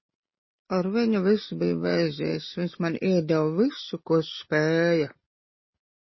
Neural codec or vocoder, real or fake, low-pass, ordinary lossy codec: none; real; 7.2 kHz; MP3, 24 kbps